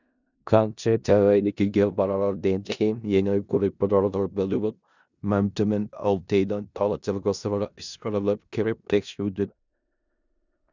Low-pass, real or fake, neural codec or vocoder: 7.2 kHz; fake; codec, 16 kHz in and 24 kHz out, 0.4 kbps, LongCat-Audio-Codec, four codebook decoder